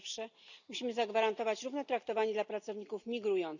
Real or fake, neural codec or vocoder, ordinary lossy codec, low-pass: real; none; none; 7.2 kHz